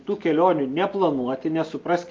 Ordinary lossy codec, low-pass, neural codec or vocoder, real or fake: Opus, 24 kbps; 7.2 kHz; none; real